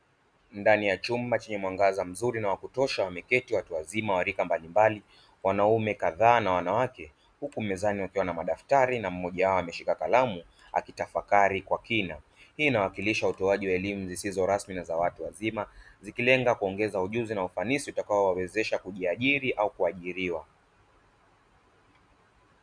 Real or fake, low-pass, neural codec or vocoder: real; 9.9 kHz; none